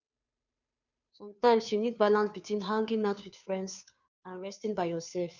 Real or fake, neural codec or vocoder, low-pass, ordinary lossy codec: fake; codec, 16 kHz, 2 kbps, FunCodec, trained on Chinese and English, 25 frames a second; 7.2 kHz; none